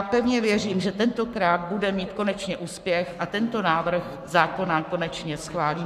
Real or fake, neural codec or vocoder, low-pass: fake; codec, 44.1 kHz, 7.8 kbps, Pupu-Codec; 14.4 kHz